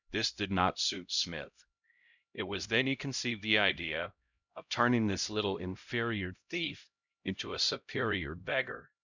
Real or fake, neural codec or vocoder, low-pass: fake; codec, 16 kHz, 0.5 kbps, X-Codec, HuBERT features, trained on LibriSpeech; 7.2 kHz